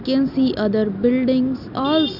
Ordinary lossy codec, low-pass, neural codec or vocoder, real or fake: none; 5.4 kHz; none; real